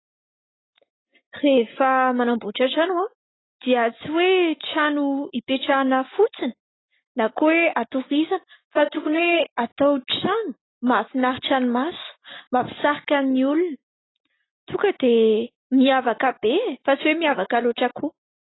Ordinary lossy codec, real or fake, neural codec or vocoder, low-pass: AAC, 16 kbps; real; none; 7.2 kHz